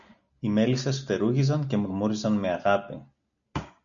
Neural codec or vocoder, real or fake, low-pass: none; real; 7.2 kHz